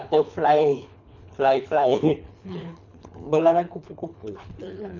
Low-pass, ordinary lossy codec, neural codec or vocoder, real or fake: 7.2 kHz; none; codec, 24 kHz, 3 kbps, HILCodec; fake